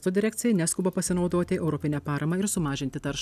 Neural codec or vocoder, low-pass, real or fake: none; 14.4 kHz; real